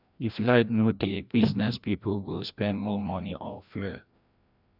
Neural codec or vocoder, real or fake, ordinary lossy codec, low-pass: codec, 16 kHz, 1 kbps, FreqCodec, larger model; fake; none; 5.4 kHz